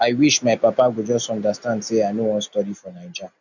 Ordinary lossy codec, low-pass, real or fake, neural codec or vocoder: none; 7.2 kHz; real; none